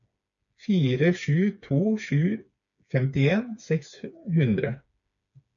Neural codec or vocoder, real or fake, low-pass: codec, 16 kHz, 4 kbps, FreqCodec, smaller model; fake; 7.2 kHz